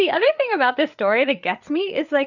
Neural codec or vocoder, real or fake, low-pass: codec, 16 kHz, 8 kbps, FreqCodec, larger model; fake; 7.2 kHz